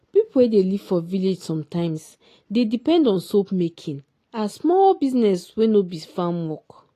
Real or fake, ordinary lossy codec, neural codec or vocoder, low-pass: real; AAC, 48 kbps; none; 14.4 kHz